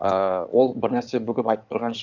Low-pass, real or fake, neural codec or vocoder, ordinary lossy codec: 7.2 kHz; fake; codec, 16 kHz in and 24 kHz out, 2.2 kbps, FireRedTTS-2 codec; none